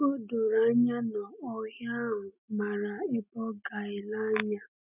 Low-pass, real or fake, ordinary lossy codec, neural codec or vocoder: 3.6 kHz; real; none; none